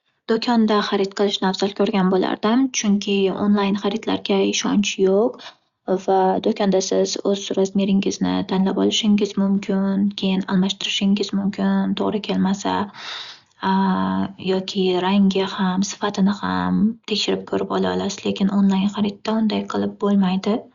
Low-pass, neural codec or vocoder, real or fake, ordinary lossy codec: 7.2 kHz; none; real; Opus, 64 kbps